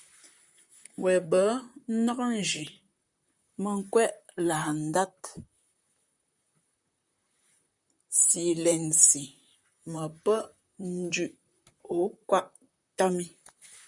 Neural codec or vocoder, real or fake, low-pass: vocoder, 44.1 kHz, 128 mel bands, Pupu-Vocoder; fake; 10.8 kHz